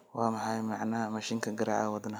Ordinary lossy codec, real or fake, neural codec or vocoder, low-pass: none; real; none; none